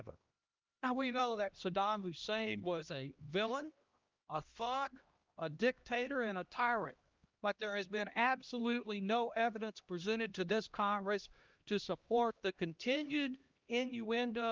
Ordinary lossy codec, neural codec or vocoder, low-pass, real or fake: Opus, 32 kbps; codec, 16 kHz, 1 kbps, X-Codec, HuBERT features, trained on LibriSpeech; 7.2 kHz; fake